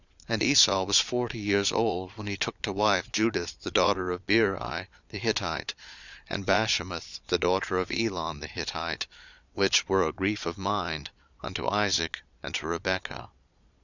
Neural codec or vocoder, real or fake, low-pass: vocoder, 22.05 kHz, 80 mel bands, Vocos; fake; 7.2 kHz